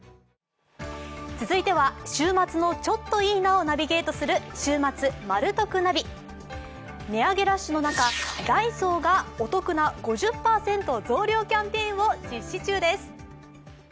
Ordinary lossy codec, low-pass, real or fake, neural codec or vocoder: none; none; real; none